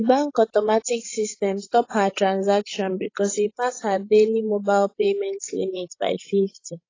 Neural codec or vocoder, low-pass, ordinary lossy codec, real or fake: codec, 16 kHz, 16 kbps, FreqCodec, larger model; 7.2 kHz; AAC, 32 kbps; fake